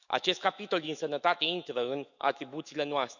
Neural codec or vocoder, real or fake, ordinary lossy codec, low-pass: autoencoder, 48 kHz, 128 numbers a frame, DAC-VAE, trained on Japanese speech; fake; none; 7.2 kHz